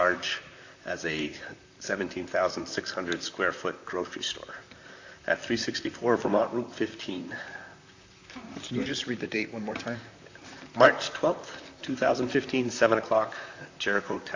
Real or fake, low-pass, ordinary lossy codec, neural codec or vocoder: fake; 7.2 kHz; AAC, 48 kbps; vocoder, 44.1 kHz, 128 mel bands, Pupu-Vocoder